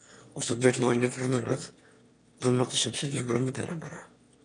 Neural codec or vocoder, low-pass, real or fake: autoencoder, 22.05 kHz, a latent of 192 numbers a frame, VITS, trained on one speaker; 9.9 kHz; fake